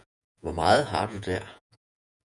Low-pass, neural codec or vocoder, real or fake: 10.8 kHz; vocoder, 48 kHz, 128 mel bands, Vocos; fake